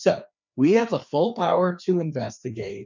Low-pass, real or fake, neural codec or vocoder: 7.2 kHz; fake; codec, 16 kHz, 2 kbps, FreqCodec, larger model